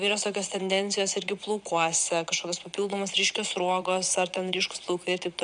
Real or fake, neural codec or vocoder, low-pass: fake; vocoder, 22.05 kHz, 80 mel bands, Vocos; 9.9 kHz